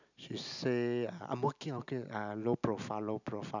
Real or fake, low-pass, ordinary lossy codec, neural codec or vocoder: real; 7.2 kHz; none; none